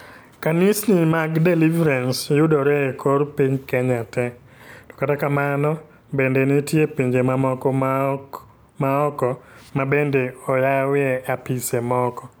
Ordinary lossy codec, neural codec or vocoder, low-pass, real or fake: none; none; none; real